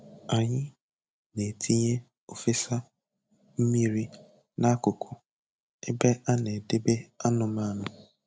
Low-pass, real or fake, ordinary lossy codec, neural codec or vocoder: none; real; none; none